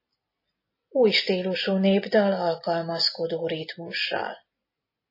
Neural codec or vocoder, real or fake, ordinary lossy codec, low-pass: none; real; MP3, 24 kbps; 5.4 kHz